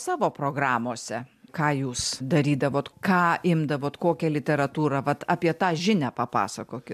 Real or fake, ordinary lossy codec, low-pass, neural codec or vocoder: real; MP3, 96 kbps; 14.4 kHz; none